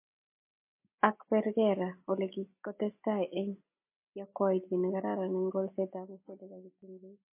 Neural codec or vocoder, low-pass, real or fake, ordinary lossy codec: none; 3.6 kHz; real; MP3, 24 kbps